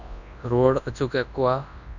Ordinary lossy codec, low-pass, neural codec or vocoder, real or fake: none; 7.2 kHz; codec, 24 kHz, 0.9 kbps, WavTokenizer, large speech release; fake